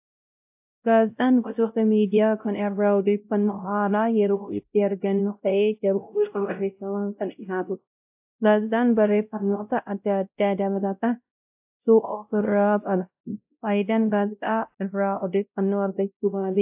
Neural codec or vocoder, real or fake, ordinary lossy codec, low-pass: codec, 16 kHz, 0.5 kbps, X-Codec, WavLM features, trained on Multilingual LibriSpeech; fake; AAC, 32 kbps; 3.6 kHz